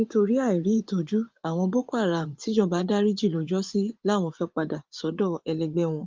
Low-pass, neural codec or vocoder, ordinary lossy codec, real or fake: 7.2 kHz; vocoder, 24 kHz, 100 mel bands, Vocos; Opus, 24 kbps; fake